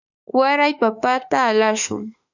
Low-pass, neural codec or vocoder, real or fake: 7.2 kHz; autoencoder, 48 kHz, 32 numbers a frame, DAC-VAE, trained on Japanese speech; fake